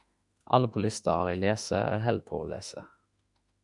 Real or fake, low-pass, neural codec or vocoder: fake; 10.8 kHz; autoencoder, 48 kHz, 32 numbers a frame, DAC-VAE, trained on Japanese speech